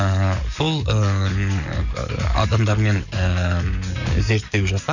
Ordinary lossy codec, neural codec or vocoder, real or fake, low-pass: none; codec, 44.1 kHz, 7.8 kbps, DAC; fake; 7.2 kHz